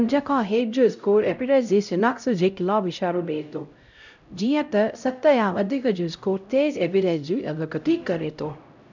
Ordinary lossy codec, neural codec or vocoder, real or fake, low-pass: none; codec, 16 kHz, 0.5 kbps, X-Codec, HuBERT features, trained on LibriSpeech; fake; 7.2 kHz